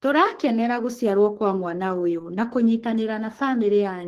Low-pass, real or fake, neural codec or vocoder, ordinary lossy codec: 14.4 kHz; fake; codec, 44.1 kHz, 3.4 kbps, Pupu-Codec; Opus, 16 kbps